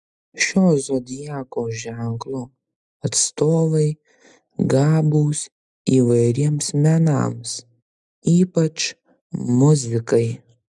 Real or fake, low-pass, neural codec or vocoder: real; 10.8 kHz; none